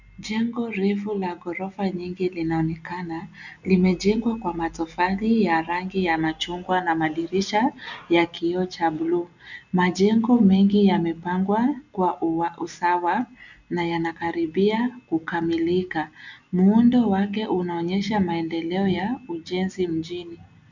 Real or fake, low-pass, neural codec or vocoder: real; 7.2 kHz; none